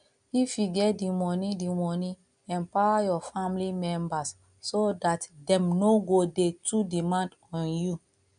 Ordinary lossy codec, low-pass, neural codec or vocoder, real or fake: none; 9.9 kHz; none; real